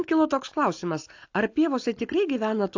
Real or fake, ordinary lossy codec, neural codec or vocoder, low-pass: real; AAC, 48 kbps; none; 7.2 kHz